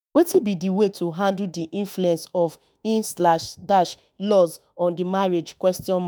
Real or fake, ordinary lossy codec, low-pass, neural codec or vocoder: fake; none; none; autoencoder, 48 kHz, 32 numbers a frame, DAC-VAE, trained on Japanese speech